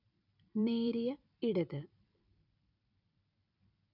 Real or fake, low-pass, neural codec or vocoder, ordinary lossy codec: fake; 5.4 kHz; vocoder, 44.1 kHz, 128 mel bands every 256 samples, BigVGAN v2; none